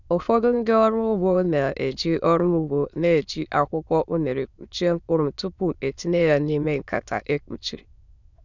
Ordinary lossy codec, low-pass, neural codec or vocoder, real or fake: none; 7.2 kHz; autoencoder, 22.05 kHz, a latent of 192 numbers a frame, VITS, trained on many speakers; fake